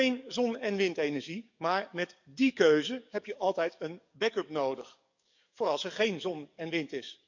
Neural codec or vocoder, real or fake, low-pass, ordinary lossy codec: codec, 44.1 kHz, 7.8 kbps, DAC; fake; 7.2 kHz; none